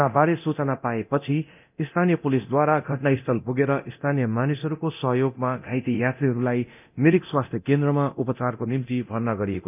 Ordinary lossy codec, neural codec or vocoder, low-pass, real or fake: none; codec, 24 kHz, 0.9 kbps, DualCodec; 3.6 kHz; fake